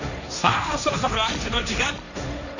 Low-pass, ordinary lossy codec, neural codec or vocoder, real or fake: 7.2 kHz; none; codec, 16 kHz, 1.1 kbps, Voila-Tokenizer; fake